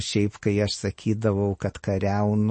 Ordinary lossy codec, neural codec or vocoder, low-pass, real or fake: MP3, 32 kbps; none; 9.9 kHz; real